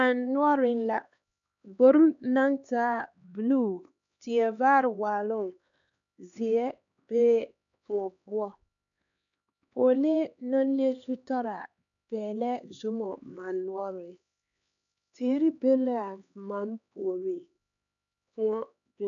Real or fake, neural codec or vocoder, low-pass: fake; codec, 16 kHz, 2 kbps, X-Codec, HuBERT features, trained on LibriSpeech; 7.2 kHz